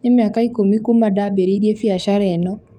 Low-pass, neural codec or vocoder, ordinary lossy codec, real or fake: 19.8 kHz; codec, 44.1 kHz, 7.8 kbps, DAC; none; fake